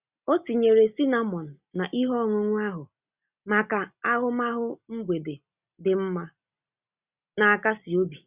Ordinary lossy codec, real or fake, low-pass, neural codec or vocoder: Opus, 64 kbps; real; 3.6 kHz; none